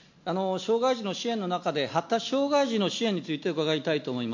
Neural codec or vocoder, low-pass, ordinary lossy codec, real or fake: none; 7.2 kHz; MP3, 48 kbps; real